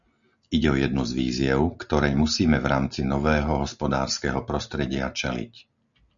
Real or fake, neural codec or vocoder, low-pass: real; none; 7.2 kHz